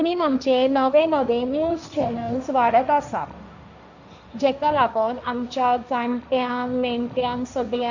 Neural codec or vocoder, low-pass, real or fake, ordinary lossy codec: codec, 16 kHz, 1.1 kbps, Voila-Tokenizer; 7.2 kHz; fake; none